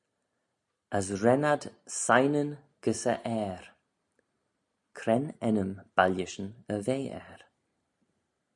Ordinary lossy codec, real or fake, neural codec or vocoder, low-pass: MP3, 96 kbps; real; none; 10.8 kHz